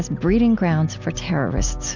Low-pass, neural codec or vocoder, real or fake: 7.2 kHz; none; real